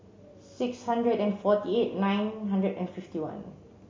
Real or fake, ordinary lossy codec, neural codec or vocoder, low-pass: real; MP3, 32 kbps; none; 7.2 kHz